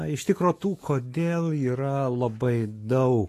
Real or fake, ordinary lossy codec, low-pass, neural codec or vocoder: fake; AAC, 48 kbps; 14.4 kHz; autoencoder, 48 kHz, 128 numbers a frame, DAC-VAE, trained on Japanese speech